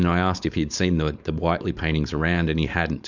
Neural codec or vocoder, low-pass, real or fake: codec, 16 kHz, 4.8 kbps, FACodec; 7.2 kHz; fake